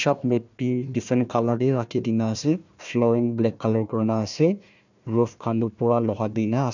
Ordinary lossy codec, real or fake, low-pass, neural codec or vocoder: none; fake; 7.2 kHz; codec, 16 kHz, 1 kbps, FunCodec, trained on Chinese and English, 50 frames a second